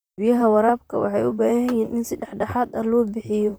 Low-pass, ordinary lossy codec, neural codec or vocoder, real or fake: none; none; vocoder, 44.1 kHz, 128 mel bands, Pupu-Vocoder; fake